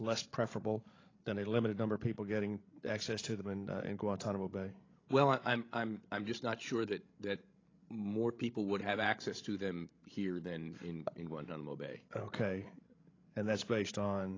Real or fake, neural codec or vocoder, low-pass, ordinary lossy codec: fake; codec, 16 kHz, 16 kbps, FreqCodec, larger model; 7.2 kHz; AAC, 32 kbps